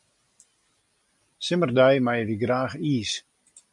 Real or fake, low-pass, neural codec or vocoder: real; 10.8 kHz; none